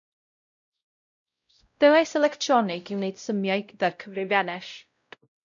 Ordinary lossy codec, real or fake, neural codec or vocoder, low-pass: MP3, 64 kbps; fake; codec, 16 kHz, 0.5 kbps, X-Codec, WavLM features, trained on Multilingual LibriSpeech; 7.2 kHz